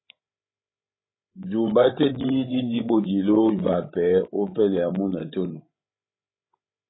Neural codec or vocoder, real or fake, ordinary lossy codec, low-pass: codec, 16 kHz, 16 kbps, FreqCodec, larger model; fake; AAC, 16 kbps; 7.2 kHz